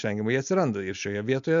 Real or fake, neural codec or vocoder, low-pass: real; none; 7.2 kHz